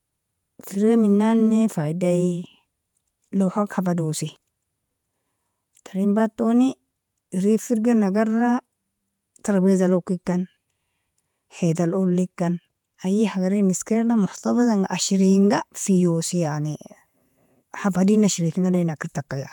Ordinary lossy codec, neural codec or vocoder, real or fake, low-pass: none; vocoder, 48 kHz, 128 mel bands, Vocos; fake; 19.8 kHz